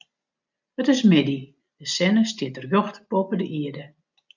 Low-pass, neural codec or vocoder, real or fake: 7.2 kHz; none; real